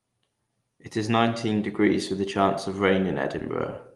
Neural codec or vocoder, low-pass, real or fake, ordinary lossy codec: none; 10.8 kHz; real; Opus, 24 kbps